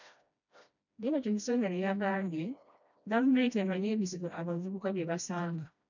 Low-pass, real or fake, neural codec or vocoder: 7.2 kHz; fake; codec, 16 kHz, 1 kbps, FreqCodec, smaller model